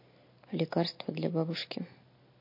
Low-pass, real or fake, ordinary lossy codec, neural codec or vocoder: 5.4 kHz; real; MP3, 32 kbps; none